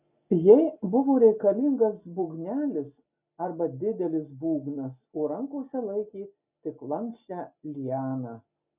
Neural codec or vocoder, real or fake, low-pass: none; real; 3.6 kHz